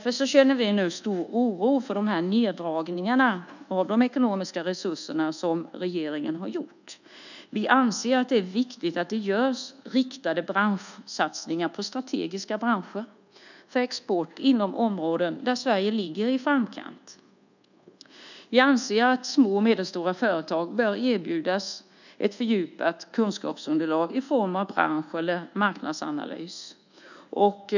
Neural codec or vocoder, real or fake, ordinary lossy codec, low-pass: codec, 24 kHz, 1.2 kbps, DualCodec; fake; none; 7.2 kHz